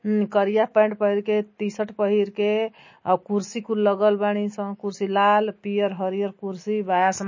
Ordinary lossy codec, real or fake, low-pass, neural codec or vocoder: MP3, 32 kbps; real; 7.2 kHz; none